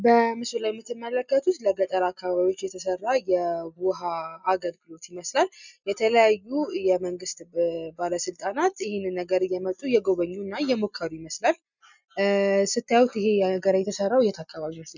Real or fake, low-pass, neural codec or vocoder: real; 7.2 kHz; none